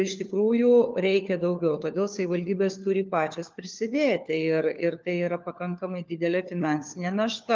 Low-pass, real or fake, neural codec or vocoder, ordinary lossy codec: 7.2 kHz; fake; codec, 16 kHz, 4 kbps, FunCodec, trained on LibriTTS, 50 frames a second; Opus, 24 kbps